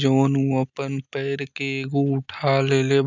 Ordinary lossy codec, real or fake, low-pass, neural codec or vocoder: none; real; 7.2 kHz; none